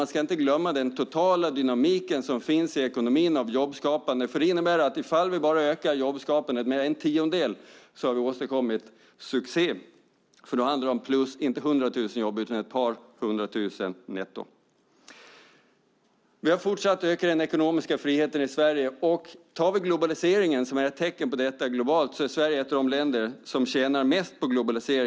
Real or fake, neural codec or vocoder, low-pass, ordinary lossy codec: real; none; none; none